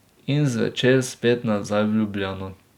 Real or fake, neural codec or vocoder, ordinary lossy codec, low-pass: real; none; none; 19.8 kHz